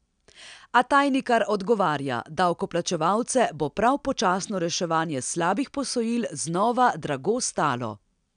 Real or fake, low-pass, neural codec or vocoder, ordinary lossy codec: real; 9.9 kHz; none; none